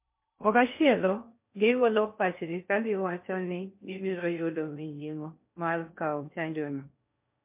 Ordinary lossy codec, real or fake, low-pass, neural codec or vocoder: MP3, 32 kbps; fake; 3.6 kHz; codec, 16 kHz in and 24 kHz out, 0.6 kbps, FocalCodec, streaming, 2048 codes